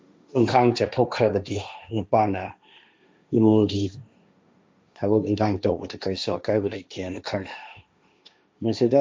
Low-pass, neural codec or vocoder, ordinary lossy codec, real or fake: none; codec, 16 kHz, 1.1 kbps, Voila-Tokenizer; none; fake